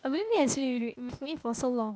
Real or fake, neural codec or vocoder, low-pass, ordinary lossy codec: fake; codec, 16 kHz, 0.8 kbps, ZipCodec; none; none